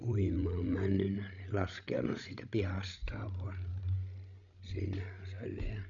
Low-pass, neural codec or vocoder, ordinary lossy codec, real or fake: 7.2 kHz; codec, 16 kHz, 16 kbps, FreqCodec, larger model; none; fake